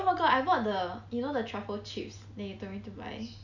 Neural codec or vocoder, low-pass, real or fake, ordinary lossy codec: none; 7.2 kHz; real; none